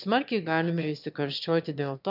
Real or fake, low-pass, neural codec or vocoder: fake; 5.4 kHz; autoencoder, 22.05 kHz, a latent of 192 numbers a frame, VITS, trained on one speaker